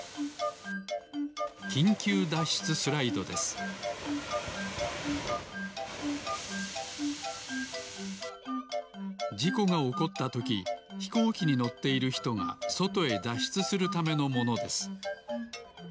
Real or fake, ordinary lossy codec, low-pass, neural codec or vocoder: real; none; none; none